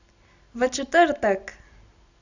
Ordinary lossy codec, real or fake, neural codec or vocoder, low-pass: none; real; none; 7.2 kHz